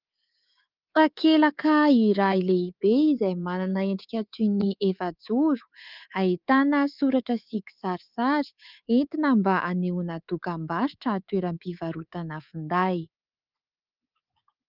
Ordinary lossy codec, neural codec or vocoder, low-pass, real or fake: Opus, 24 kbps; codec, 24 kHz, 3.1 kbps, DualCodec; 5.4 kHz; fake